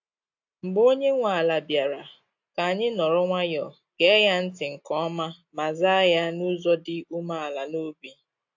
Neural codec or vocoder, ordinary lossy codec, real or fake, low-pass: none; AAC, 48 kbps; real; 7.2 kHz